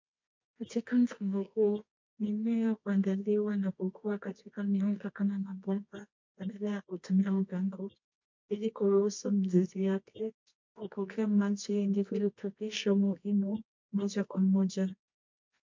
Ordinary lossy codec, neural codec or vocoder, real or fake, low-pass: MP3, 48 kbps; codec, 24 kHz, 0.9 kbps, WavTokenizer, medium music audio release; fake; 7.2 kHz